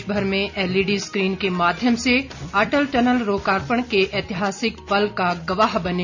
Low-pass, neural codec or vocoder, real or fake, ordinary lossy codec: 7.2 kHz; none; real; none